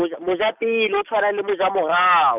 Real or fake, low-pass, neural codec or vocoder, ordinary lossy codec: real; 3.6 kHz; none; none